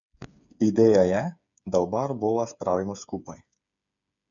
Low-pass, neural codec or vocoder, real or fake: 7.2 kHz; codec, 16 kHz, 8 kbps, FreqCodec, smaller model; fake